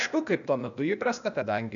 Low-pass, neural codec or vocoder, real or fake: 7.2 kHz; codec, 16 kHz, 0.8 kbps, ZipCodec; fake